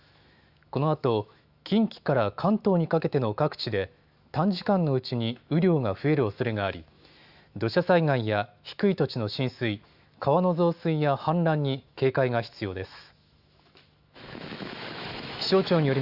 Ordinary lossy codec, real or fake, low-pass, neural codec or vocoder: Opus, 64 kbps; fake; 5.4 kHz; autoencoder, 48 kHz, 128 numbers a frame, DAC-VAE, trained on Japanese speech